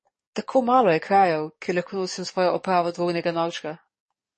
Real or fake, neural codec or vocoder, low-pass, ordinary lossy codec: fake; codec, 44.1 kHz, 7.8 kbps, DAC; 10.8 kHz; MP3, 32 kbps